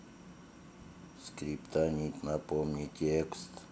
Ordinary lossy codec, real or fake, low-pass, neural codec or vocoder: none; real; none; none